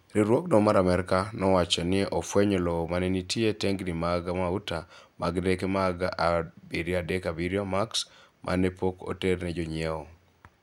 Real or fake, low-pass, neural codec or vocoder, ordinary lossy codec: real; 19.8 kHz; none; none